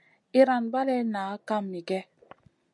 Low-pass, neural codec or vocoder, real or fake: 10.8 kHz; none; real